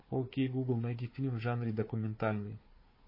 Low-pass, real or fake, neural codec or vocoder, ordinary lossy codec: 5.4 kHz; fake; codec, 16 kHz, 4 kbps, FunCodec, trained on Chinese and English, 50 frames a second; MP3, 24 kbps